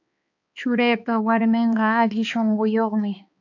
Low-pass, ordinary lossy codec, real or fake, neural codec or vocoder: 7.2 kHz; AAC, 48 kbps; fake; codec, 16 kHz, 2 kbps, X-Codec, HuBERT features, trained on balanced general audio